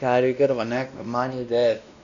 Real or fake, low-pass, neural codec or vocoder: fake; 7.2 kHz; codec, 16 kHz, 1 kbps, X-Codec, WavLM features, trained on Multilingual LibriSpeech